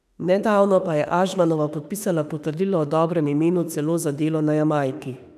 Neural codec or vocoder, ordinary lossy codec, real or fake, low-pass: autoencoder, 48 kHz, 32 numbers a frame, DAC-VAE, trained on Japanese speech; none; fake; 14.4 kHz